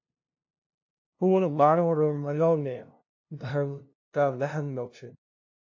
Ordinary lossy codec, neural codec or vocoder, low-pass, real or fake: AAC, 48 kbps; codec, 16 kHz, 0.5 kbps, FunCodec, trained on LibriTTS, 25 frames a second; 7.2 kHz; fake